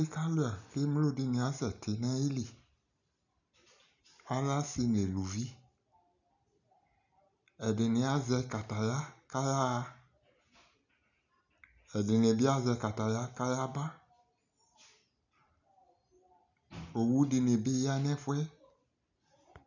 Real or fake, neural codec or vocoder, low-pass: real; none; 7.2 kHz